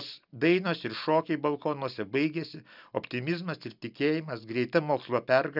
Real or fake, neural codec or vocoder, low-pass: real; none; 5.4 kHz